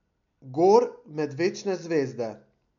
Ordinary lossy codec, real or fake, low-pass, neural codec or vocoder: none; real; 7.2 kHz; none